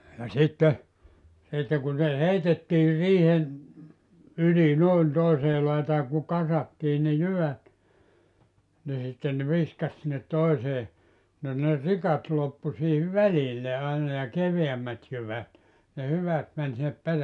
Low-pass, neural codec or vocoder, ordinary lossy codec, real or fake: 10.8 kHz; none; none; real